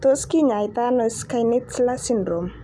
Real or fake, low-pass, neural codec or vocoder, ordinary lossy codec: real; none; none; none